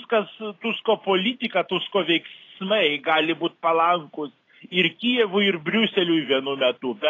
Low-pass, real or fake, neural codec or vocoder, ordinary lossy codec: 7.2 kHz; real; none; AAC, 32 kbps